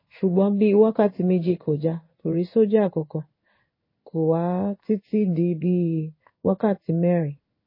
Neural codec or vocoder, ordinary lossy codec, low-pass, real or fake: codec, 16 kHz in and 24 kHz out, 1 kbps, XY-Tokenizer; MP3, 24 kbps; 5.4 kHz; fake